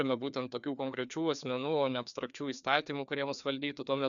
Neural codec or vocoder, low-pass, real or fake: codec, 16 kHz, 2 kbps, FreqCodec, larger model; 7.2 kHz; fake